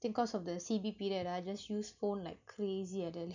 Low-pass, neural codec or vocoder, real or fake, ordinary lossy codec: 7.2 kHz; none; real; none